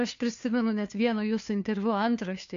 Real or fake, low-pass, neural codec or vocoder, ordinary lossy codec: fake; 7.2 kHz; codec, 16 kHz, 2 kbps, FunCodec, trained on Chinese and English, 25 frames a second; AAC, 64 kbps